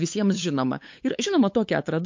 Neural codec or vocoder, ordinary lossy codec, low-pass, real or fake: codec, 16 kHz, 8 kbps, FunCodec, trained on LibriTTS, 25 frames a second; MP3, 48 kbps; 7.2 kHz; fake